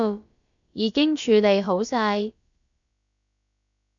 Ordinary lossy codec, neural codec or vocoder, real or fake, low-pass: AAC, 64 kbps; codec, 16 kHz, about 1 kbps, DyCAST, with the encoder's durations; fake; 7.2 kHz